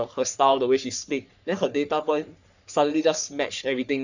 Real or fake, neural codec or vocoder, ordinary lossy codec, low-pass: fake; codec, 44.1 kHz, 3.4 kbps, Pupu-Codec; none; 7.2 kHz